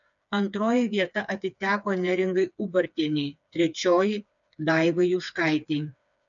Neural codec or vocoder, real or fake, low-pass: codec, 16 kHz, 4 kbps, FreqCodec, smaller model; fake; 7.2 kHz